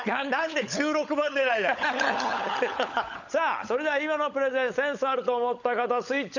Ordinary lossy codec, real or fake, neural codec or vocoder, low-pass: none; fake; codec, 16 kHz, 16 kbps, FunCodec, trained on LibriTTS, 50 frames a second; 7.2 kHz